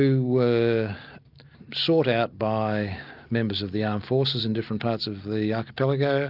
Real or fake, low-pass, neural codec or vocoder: real; 5.4 kHz; none